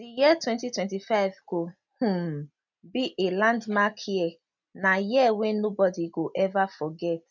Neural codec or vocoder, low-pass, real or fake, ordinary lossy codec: none; 7.2 kHz; real; none